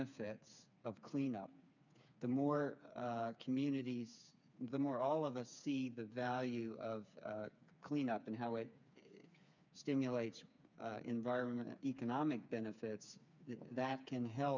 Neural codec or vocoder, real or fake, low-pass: codec, 16 kHz, 4 kbps, FreqCodec, smaller model; fake; 7.2 kHz